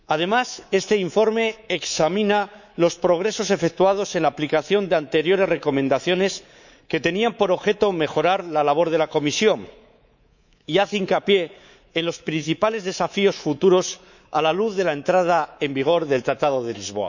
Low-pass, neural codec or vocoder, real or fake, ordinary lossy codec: 7.2 kHz; codec, 24 kHz, 3.1 kbps, DualCodec; fake; none